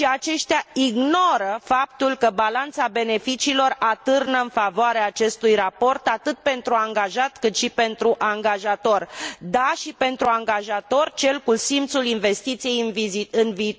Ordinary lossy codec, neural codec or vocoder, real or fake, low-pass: none; none; real; none